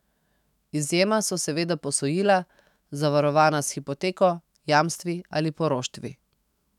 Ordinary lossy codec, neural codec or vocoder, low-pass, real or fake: none; autoencoder, 48 kHz, 128 numbers a frame, DAC-VAE, trained on Japanese speech; 19.8 kHz; fake